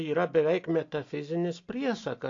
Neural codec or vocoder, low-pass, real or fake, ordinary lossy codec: none; 7.2 kHz; real; AAC, 48 kbps